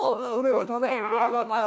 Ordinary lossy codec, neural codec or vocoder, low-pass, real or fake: none; codec, 16 kHz, 1 kbps, FunCodec, trained on LibriTTS, 50 frames a second; none; fake